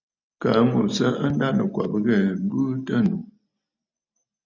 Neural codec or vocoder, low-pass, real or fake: none; 7.2 kHz; real